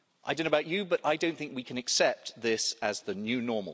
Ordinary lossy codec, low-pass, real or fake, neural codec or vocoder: none; none; real; none